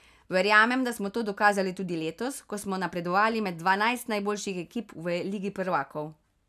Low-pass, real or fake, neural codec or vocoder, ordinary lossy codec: 14.4 kHz; real; none; none